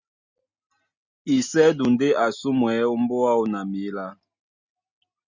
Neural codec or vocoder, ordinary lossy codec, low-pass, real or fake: none; Opus, 64 kbps; 7.2 kHz; real